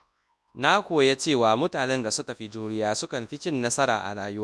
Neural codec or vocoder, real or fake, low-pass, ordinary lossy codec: codec, 24 kHz, 0.9 kbps, WavTokenizer, large speech release; fake; none; none